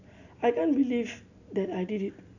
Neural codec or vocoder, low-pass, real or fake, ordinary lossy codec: none; 7.2 kHz; real; none